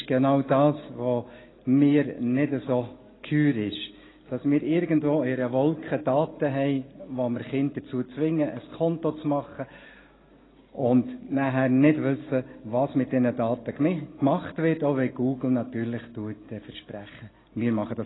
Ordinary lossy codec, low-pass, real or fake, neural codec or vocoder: AAC, 16 kbps; 7.2 kHz; real; none